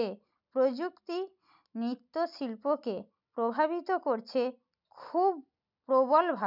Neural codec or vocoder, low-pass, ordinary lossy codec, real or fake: none; 5.4 kHz; none; real